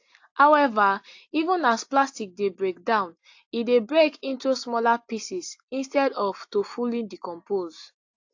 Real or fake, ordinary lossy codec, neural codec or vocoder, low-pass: real; AAC, 48 kbps; none; 7.2 kHz